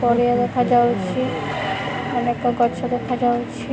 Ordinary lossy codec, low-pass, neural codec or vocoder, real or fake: none; none; none; real